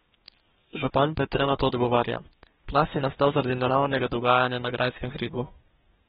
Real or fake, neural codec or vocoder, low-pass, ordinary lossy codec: fake; codec, 32 kHz, 1.9 kbps, SNAC; 14.4 kHz; AAC, 16 kbps